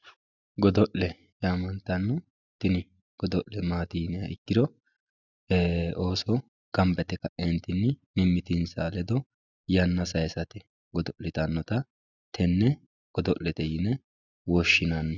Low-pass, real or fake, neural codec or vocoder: 7.2 kHz; real; none